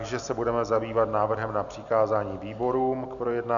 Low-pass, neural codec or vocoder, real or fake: 7.2 kHz; none; real